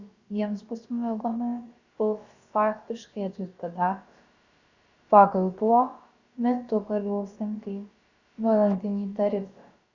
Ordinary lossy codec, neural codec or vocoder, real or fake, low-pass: Opus, 64 kbps; codec, 16 kHz, about 1 kbps, DyCAST, with the encoder's durations; fake; 7.2 kHz